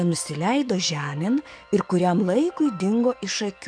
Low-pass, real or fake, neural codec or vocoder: 9.9 kHz; fake; codec, 44.1 kHz, 7.8 kbps, DAC